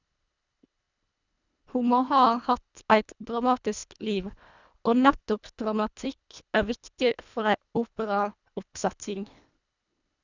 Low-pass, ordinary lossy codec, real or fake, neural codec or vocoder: 7.2 kHz; none; fake; codec, 24 kHz, 1.5 kbps, HILCodec